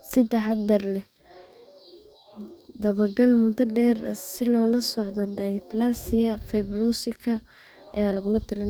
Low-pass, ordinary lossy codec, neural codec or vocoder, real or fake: none; none; codec, 44.1 kHz, 2.6 kbps, DAC; fake